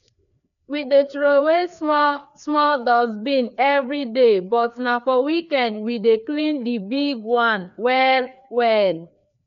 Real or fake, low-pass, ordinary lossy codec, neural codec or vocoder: fake; 7.2 kHz; none; codec, 16 kHz, 2 kbps, FreqCodec, larger model